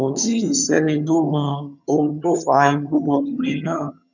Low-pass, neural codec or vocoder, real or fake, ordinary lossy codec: 7.2 kHz; vocoder, 22.05 kHz, 80 mel bands, HiFi-GAN; fake; none